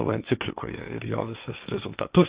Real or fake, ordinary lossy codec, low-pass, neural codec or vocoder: fake; Opus, 64 kbps; 3.6 kHz; codec, 16 kHz, 1.1 kbps, Voila-Tokenizer